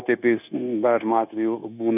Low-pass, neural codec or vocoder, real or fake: 3.6 kHz; codec, 16 kHz, 0.9 kbps, LongCat-Audio-Codec; fake